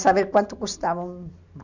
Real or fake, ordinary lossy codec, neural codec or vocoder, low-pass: real; none; none; 7.2 kHz